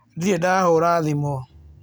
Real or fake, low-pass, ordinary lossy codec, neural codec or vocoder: real; none; none; none